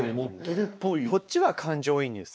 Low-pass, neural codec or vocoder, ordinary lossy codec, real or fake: none; codec, 16 kHz, 2 kbps, X-Codec, WavLM features, trained on Multilingual LibriSpeech; none; fake